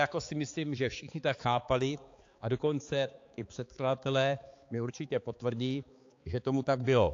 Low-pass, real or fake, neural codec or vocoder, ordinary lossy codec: 7.2 kHz; fake; codec, 16 kHz, 4 kbps, X-Codec, HuBERT features, trained on balanced general audio; AAC, 48 kbps